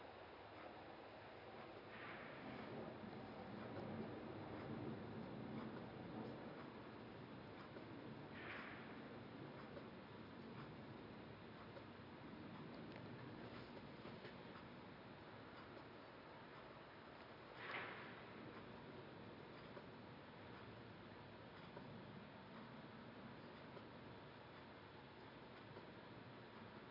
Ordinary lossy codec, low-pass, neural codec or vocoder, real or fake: none; 5.4 kHz; none; real